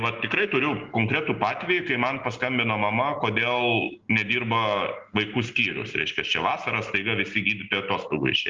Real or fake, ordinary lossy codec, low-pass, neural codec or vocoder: real; Opus, 32 kbps; 7.2 kHz; none